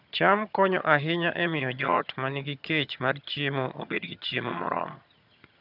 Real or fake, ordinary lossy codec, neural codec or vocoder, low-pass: fake; none; vocoder, 22.05 kHz, 80 mel bands, HiFi-GAN; 5.4 kHz